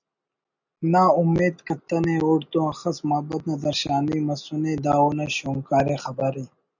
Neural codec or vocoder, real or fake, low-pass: none; real; 7.2 kHz